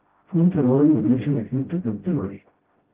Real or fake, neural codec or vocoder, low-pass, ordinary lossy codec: fake; codec, 16 kHz, 0.5 kbps, FreqCodec, smaller model; 3.6 kHz; Opus, 16 kbps